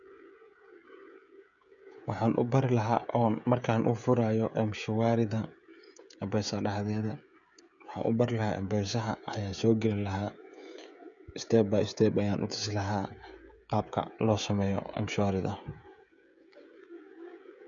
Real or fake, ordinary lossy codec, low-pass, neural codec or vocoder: fake; none; 7.2 kHz; codec, 16 kHz, 16 kbps, FreqCodec, smaller model